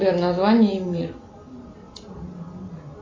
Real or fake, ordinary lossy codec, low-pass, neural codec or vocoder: real; AAC, 32 kbps; 7.2 kHz; none